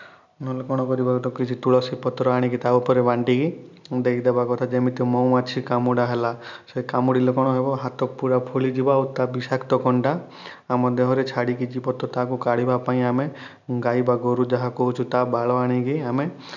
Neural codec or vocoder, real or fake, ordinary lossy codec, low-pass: none; real; none; 7.2 kHz